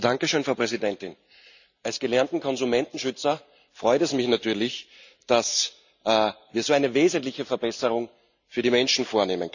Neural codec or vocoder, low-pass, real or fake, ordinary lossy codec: none; 7.2 kHz; real; none